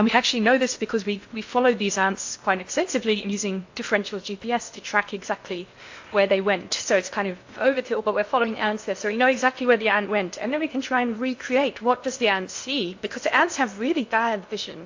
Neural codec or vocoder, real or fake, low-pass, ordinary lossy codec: codec, 16 kHz in and 24 kHz out, 0.8 kbps, FocalCodec, streaming, 65536 codes; fake; 7.2 kHz; AAC, 48 kbps